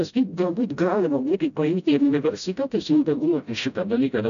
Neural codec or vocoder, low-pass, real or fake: codec, 16 kHz, 0.5 kbps, FreqCodec, smaller model; 7.2 kHz; fake